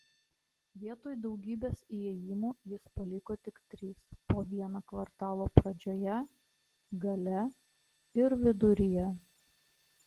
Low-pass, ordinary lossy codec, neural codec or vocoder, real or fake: 14.4 kHz; Opus, 16 kbps; none; real